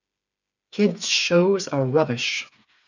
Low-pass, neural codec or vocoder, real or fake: 7.2 kHz; codec, 16 kHz, 4 kbps, FreqCodec, smaller model; fake